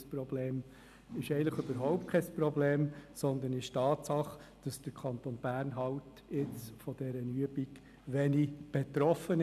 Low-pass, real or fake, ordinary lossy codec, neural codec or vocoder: 14.4 kHz; real; none; none